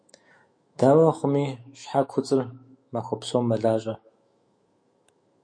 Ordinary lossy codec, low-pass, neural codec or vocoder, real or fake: MP3, 48 kbps; 9.9 kHz; autoencoder, 48 kHz, 128 numbers a frame, DAC-VAE, trained on Japanese speech; fake